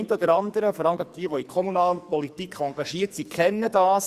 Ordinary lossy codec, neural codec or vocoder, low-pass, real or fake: Opus, 64 kbps; codec, 44.1 kHz, 2.6 kbps, SNAC; 14.4 kHz; fake